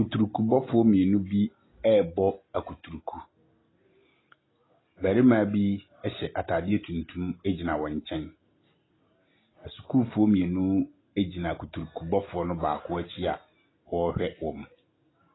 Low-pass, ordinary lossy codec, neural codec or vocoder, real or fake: 7.2 kHz; AAC, 16 kbps; none; real